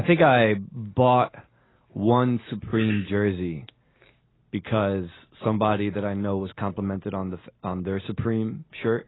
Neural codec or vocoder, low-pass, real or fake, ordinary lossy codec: none; 7.2 kHz; real; AAC, 16 kbps